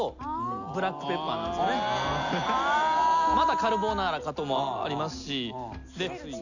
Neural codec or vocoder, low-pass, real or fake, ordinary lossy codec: none; 7.2 kHz; real; none